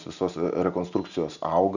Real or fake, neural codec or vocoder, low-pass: real; none; 7.2 kHz